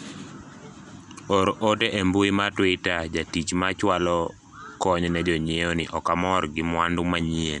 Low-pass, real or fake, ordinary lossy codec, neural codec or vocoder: 10.8 kHz; real; none; none